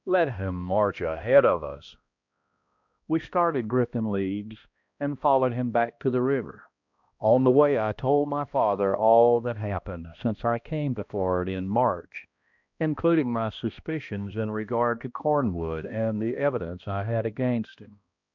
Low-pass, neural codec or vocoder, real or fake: 7.2 kHz; codec, 16 kHz, 1 kbps, X-Codec, HuBERT features, trained on balanced general audio; fake